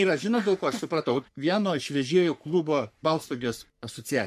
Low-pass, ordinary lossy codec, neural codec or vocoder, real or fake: 14.4 kHz; AAC, 96 kbps; codec, 44.1 kHz, 3.4 kbps, Pupu-Codec; fake